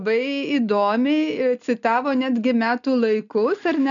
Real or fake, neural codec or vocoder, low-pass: real; none; 7.2 kHz